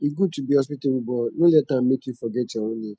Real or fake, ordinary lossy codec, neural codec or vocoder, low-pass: real; none; none; none